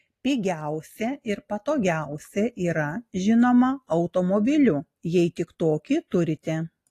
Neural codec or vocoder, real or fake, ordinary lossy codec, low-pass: none; real; AAC, 48 kbps; 14.4 kHz